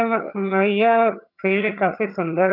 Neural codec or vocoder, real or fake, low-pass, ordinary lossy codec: vocoder, 22.05 kHz, 80 mel bands, HiFi-GAN; fake; 5.4 kHz; none